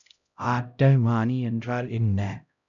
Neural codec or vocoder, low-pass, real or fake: codec, 16 kHz, 0.5 kbps, X-Codec, HuBERT features, trained on LibriSpeech; 7.2 kHz; fake